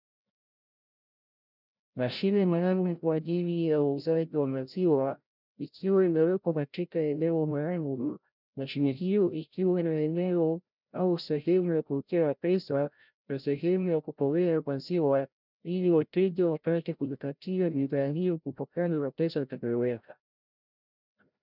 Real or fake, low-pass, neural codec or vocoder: fake; 5.4 kHz; codec, 16 kHz, 0.5 kbps, FreqCodec, larger model